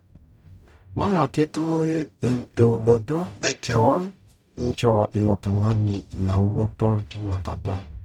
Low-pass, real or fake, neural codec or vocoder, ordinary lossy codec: 19.8 kHz; fake; codec, 44.1 kHz, 0.9 kbps, DAC; none